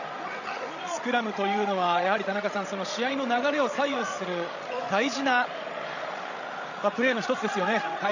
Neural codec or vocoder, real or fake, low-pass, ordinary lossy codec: codec, 16 kHz, 16 kbps, FreqCodec, larger model; fake; 7.2 kHz; none